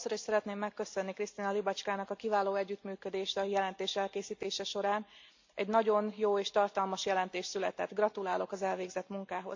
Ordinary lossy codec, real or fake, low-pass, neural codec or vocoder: MP3, 48 kbps; real; 7.2 kHz; none